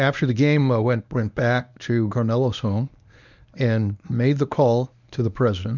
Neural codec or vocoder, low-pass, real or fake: codec, 24 kHz, 0.9 kbps, WavTokenizer, medium speech release version 1; 7.2 kHz; fake